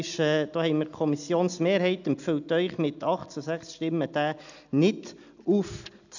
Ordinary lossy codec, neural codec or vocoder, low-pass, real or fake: none; none; 7.2 kHz; real